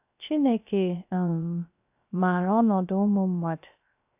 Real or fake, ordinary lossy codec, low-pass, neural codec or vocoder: fake; AAC, 32 kbps; 3.6 kHz; codec, 16 kHz, 0.3 kbps, FocalCodec